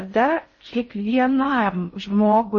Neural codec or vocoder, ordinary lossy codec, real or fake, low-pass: codec, 16 kHz in and 24 kHz out, 0.6 kbps, FocalCodec, streaming, 4096 codes; MP3, 32 kbps; fake; 10.8 kHz